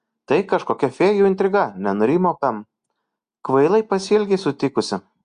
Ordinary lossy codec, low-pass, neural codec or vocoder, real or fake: Opus, 64 kbps; 9.9 kHz; none; real